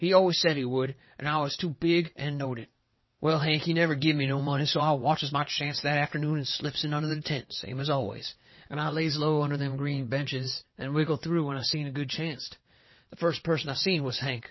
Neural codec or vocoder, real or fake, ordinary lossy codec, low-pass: vocoder, 22.05 kHz, 80 mel bands, WaveNeXt; fake; MP3, 24 kbps; 7.2 kHz